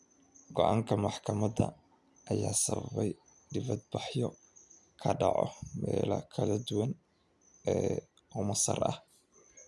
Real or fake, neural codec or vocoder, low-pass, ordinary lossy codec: real; none; none; none